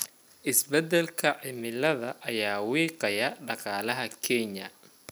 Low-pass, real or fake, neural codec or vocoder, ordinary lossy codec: none; real; none; none